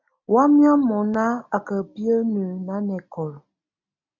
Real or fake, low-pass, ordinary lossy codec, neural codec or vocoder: real; 7.2 kHz; AAC, 48 kbps; none